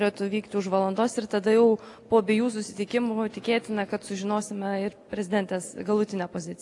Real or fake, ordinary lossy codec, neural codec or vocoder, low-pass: real; AAC, 48 kbps; none; 10.8 kHz